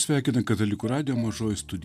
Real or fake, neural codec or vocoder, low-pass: real; none; 14.4 kHz